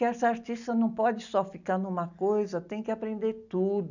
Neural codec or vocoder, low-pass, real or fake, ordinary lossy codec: none; 7.2 kHz; real; none